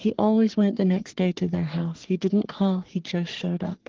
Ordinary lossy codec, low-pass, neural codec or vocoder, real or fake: Opus, 16 kbps; 7.2 kHz; codec, 44.1 kHz, 3.4 kbps, Pupu-Codec; fake